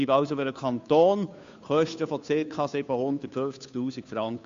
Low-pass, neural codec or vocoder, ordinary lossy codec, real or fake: 7.2 kHz; codec, 16 kHz, 2 kbps, FunCodec, trained on Chinese and English, 25 frames a second; AAC, 64 kbps; fake